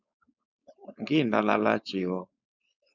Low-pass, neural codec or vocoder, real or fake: 7.2 kHz; codec, 16 kHz, 4.8 kbps, FACodec; fake